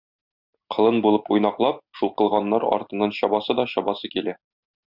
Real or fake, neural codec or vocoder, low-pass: real; none; 5.4 kHz